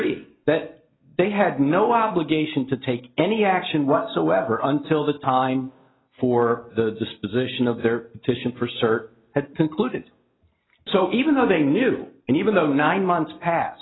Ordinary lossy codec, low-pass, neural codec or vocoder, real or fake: AAC, 16 kbps; 7.2 kHz; none; real